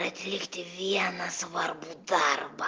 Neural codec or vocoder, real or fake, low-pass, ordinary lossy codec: none; real; 7.2 kHz; Opus, 24 kbps